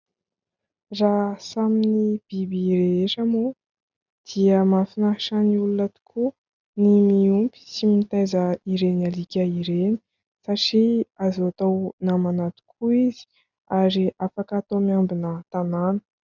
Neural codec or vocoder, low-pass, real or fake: none; 7.2 kHz; real